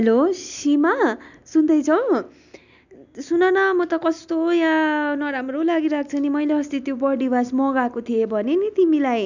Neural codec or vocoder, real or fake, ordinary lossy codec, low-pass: none; real; none; 7.2 kHz